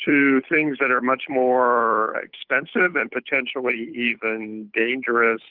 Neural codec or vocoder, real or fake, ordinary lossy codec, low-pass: codec, 16 kHz, 8 kbps, FunCodec, trained on Chinese and English, 25 frames a second; fake; Opus, 32 kbps; 5.4 kHz